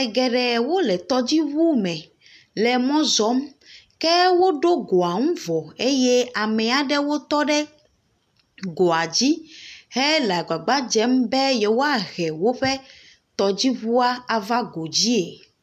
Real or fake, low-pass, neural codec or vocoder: real; 14.4 kHz; none